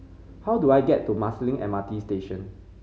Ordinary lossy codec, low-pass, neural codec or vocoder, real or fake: none; none; none; real